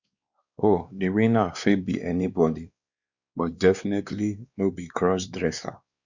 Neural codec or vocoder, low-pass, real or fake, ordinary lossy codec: codec, 16 kHz, 2 kbps, X-Codec, WavLM features, trained on Multilingual LibriSpeech; 7.2 kHz; fake; Opus, 64 kbps